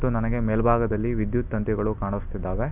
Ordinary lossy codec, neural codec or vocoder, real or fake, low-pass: none; none; real; 3.6 kHz